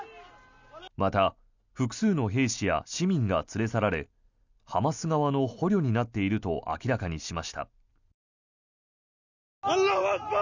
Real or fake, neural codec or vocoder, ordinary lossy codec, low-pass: real; none; none; 7.2 kHz